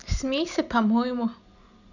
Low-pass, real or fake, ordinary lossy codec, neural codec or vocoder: 7.2 kHz; real; none; none